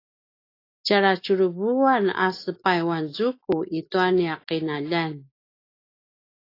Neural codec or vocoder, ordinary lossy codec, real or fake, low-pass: none; AAC, 32 kbps; real; 5.4 kHz